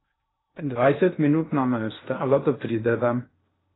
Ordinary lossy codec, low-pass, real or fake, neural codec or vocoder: AAC, 16 kbps; 7.2 kHz; fake; codec, 16 kHz in and 24 kHz out, 0.6 kbps, FocalCodec, streaming, 2048 codes